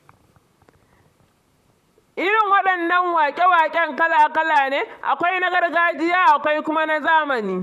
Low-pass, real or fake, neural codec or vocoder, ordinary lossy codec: 14.4 kHz; fake; vocoder, 44.1 kHz, 128 mel bands, Pupu-Vocoder; none